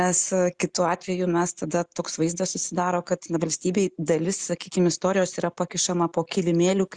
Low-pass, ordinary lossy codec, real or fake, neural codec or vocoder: 9.9 kHz; Opus, 64 kbps; real; none